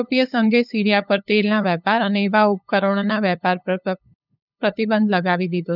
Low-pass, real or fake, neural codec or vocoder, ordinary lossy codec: 5.4 kHz; fake; codec, 16 kHz, 8 kbps, FunCodec, trained on LibriTTS, 25 frames a second; none